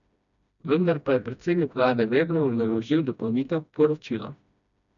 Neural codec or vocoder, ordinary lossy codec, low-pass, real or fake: codec, 16 kHz, 1 kbps, FreqCodec, smaller model; none; 7.2 kHz; fake